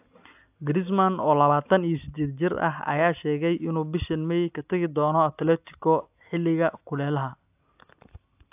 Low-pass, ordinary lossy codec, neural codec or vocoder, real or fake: 3.6 kHz; AAC, 32 kbps; none; real